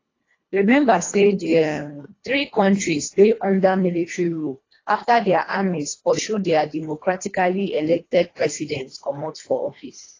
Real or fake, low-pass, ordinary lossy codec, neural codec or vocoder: fake; 7.2 kHz; AAC, 32 kbps; codec, 24 kHz, 1.5 kbps, HILCodec